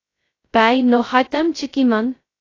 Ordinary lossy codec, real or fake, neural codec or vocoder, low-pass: AAC, 32 kbps; fake; codec, 16 kHz, 0.2 kbps, FocalCodec; 7.2 kHz